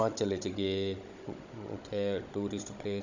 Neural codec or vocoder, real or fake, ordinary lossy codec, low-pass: codec, 16 kHz, 16 kbps, FunCodec, trained on Chinese and English, 50 frames a second; fake; none; 7.2 kHz